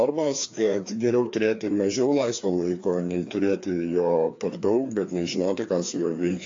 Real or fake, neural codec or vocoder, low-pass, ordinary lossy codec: fake; codec, 16 kHz, 2 kbps, FreqCodec, larger model; 7.2 kHz; AAC, 48 kbps